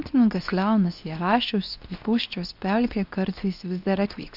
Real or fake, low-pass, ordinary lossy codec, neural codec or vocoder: fake; 5.4 kHz; AAC, 48 kbps; codec, 24 kHz, 0.9 kbps, WavTokenizer, medium speech release version 2